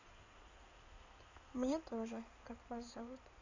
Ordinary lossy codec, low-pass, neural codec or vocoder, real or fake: MP3, 64 kbps; 7.2 kHz; codec, 16 kHz in and 24 kHz out, 2.2 kbps, FireRedTTS-2 codec; fake